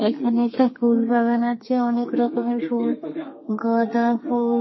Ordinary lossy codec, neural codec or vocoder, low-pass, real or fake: MP3, 24 kbps; codec, 32 kHz, 1.9 kbps, SNAC; 7.2 kHz; fake